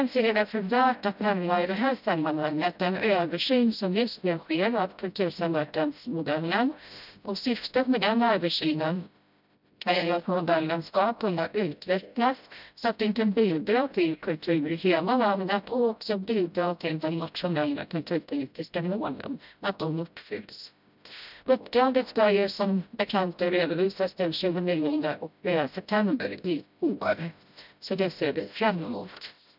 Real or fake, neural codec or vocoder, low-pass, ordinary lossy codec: fake; codec, 16 kHz, 0.5 kbps, FreqCodec, smaller model; 5.4 kHz; none